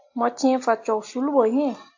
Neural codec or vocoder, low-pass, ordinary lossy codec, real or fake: none; 7.2 kHz; AAC, 48 kbps; real